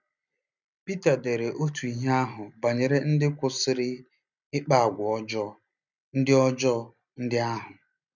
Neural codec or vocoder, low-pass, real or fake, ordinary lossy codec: none; 7.2 kHz; real; none